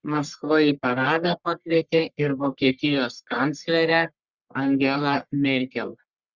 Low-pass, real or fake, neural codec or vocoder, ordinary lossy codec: 7.2 kHz; fake; codec, 44.1 kHz, 1.7 kbps, Pupu-Codec; Opus, 64 kbps